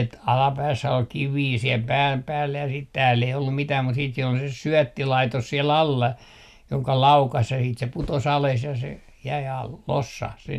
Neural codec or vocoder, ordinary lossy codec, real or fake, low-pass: none; none; real; 14.4 kHz